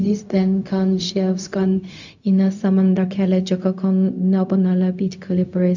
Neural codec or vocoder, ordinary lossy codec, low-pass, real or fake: codec, 16 kHz, 0.4 kbps, LongCat-Audio-Codec; none; 7.2 kHz; fake